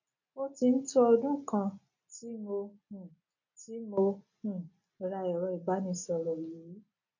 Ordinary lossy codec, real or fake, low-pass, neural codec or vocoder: none; real; 7.2 kHz; none